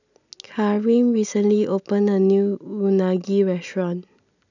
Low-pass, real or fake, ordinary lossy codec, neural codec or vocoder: 7.2 kHz; real; none; none